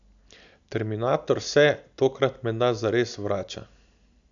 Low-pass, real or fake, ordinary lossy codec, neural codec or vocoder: 7.2 kHz; real; none; none